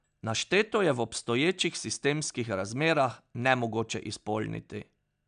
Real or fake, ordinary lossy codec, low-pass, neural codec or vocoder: real; MP3, 96 kbps; 9.9 kHz; none